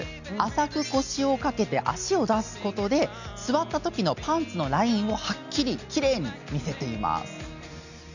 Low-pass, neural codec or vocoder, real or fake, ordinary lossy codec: 7.2 kHz; none; real; none